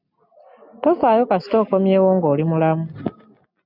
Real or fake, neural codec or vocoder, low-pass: real; none; 5.4 kHz